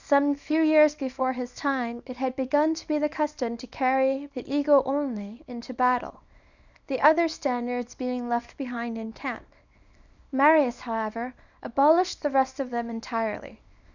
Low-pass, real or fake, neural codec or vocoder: 7.2 kHz; fake; codec, 24 kHz, 0.9 kbps, WavTokenizer, small release